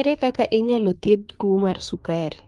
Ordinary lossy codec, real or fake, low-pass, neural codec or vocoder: Opus, 32 kbps; fake; 10.8 kHz; codec, 24 kHz, 1 kbps, SNAC